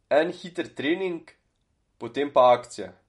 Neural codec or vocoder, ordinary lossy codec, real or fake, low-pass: none; MP3, 48 kbps; real; 19.8 kHz